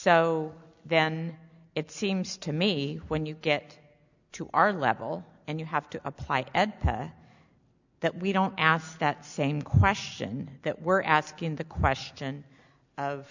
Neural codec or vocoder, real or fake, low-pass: none; real; 7.2 kHz